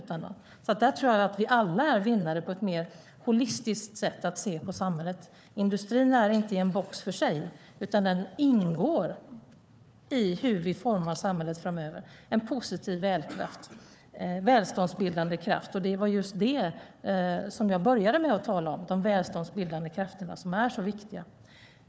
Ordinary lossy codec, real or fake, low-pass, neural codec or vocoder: none; fake; none; codec, 16 kHz, 4 kbps, FunCodec, trained on Chinese and English, 50 frames a second